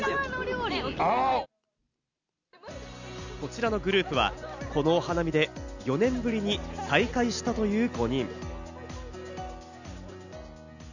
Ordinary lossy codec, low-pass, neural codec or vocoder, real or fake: none; 7.2 kHz; none; real